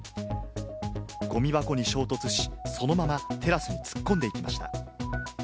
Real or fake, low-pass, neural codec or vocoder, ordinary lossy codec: real; none; none; none